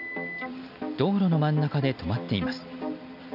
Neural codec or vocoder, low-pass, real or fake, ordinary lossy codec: none; 5.4 kHz; real; none